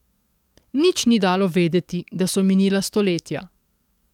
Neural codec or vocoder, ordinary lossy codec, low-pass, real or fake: codec, 44.1 kHz, 7.8 kbps, DAC; none; 19.8 kHz; fake